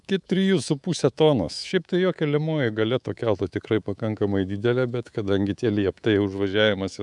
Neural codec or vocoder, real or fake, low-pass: codec, 24 kHz, 3.1 kbps, DualCodec; fake; 10.8 kHz